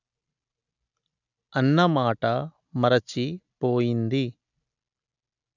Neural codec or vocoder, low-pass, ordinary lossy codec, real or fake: none; 7.2 kHz; none; real